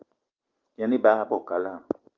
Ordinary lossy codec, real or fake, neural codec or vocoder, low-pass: Opus, 24 kbps; real; none; 7.2 kHz